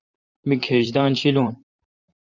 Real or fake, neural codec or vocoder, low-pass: fake; vocoder, 44.1 kHz, 128 mel bands, Pupu-Vocoder; 7.2 kHz